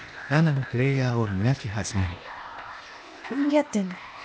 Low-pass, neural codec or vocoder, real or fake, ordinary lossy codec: none; codec, 16 kHz, 0.8 kbps, ZipCodec; fake; none